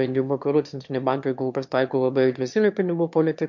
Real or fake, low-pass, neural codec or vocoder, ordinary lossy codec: fake; 7.2 kHz; autoencoder, 22.05 kHz, a latent of 192 numbers a frame, VITS, trained on one speaker; MP3, 48 kbps